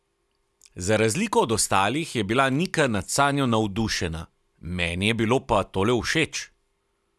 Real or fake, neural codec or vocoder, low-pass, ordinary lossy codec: real; none; none; none